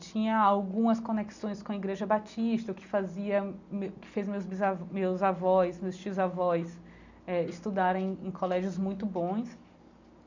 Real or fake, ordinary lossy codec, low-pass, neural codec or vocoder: real; none; 7.2 kHz; none